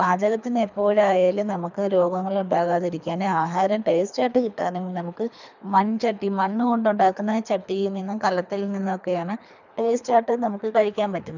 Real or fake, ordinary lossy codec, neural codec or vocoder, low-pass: fake; none; codec, 24 kHz, 3 kbps, HILCodec; 7.2 kHz